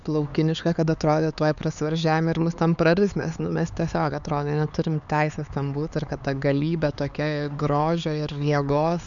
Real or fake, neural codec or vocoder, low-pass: fake; codec, 16 kHz, 4 kbps, X-Codec, HuBERT features, trained on LibriSpeech; 7.2 kHz